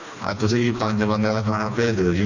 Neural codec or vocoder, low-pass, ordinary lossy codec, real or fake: codec, 16 kHz, 2 kbps, FreqCodec, smaller model; 7.2 kHz; none; fake